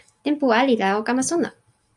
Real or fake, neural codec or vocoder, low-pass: real; none; 10.8 kHz